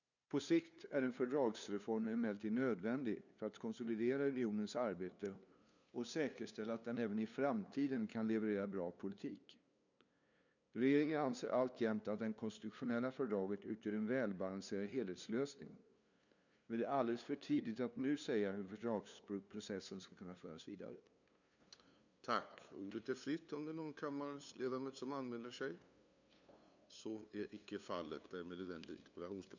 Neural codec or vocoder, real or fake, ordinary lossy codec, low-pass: codec, 16 kHz, 2 kbps, FunCodec, trained on LibriTTS, 25 frames a second; fake; none; 7.2 kHz